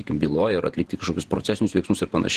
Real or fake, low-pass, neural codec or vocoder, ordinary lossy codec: fake; 14.4 kHz; vocoder, 48 kHz, 128 mel bands, Vocos; Opus, 16 kbps